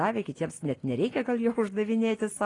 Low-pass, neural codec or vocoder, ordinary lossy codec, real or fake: 10.8 kHz; none; AAC, 32 kbps; real